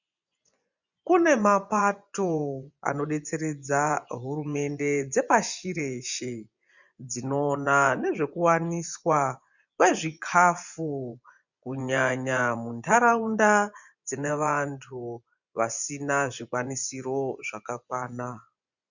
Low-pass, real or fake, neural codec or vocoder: 7.2 kHz; fake; vocoder, 24 kHz, 100 mel bands, Vocos